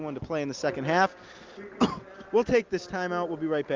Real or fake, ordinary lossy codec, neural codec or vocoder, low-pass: real; Opus, 32 kbps; none; 7.2 kHz